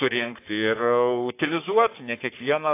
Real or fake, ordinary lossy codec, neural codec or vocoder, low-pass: fake; AAC, 24 kbps; codec, 44.1 kHz, 3.4 kbps, Pupu-Codec; 3.6 kHz